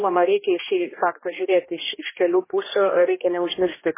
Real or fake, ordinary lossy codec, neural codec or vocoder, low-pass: fake; MP3, 16 kbps; codec, 16 kHz, 1 kbps, X-Codec, HuBERT features, trained on general audio; 3.6 kHz